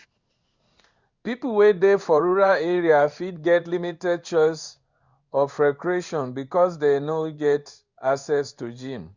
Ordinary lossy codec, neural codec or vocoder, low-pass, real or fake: Opus, 64 kbps; codec, 16 kHz in and 24 kHz out, 1 kbps, XY-Tokenizer; 7.2 kHz; fake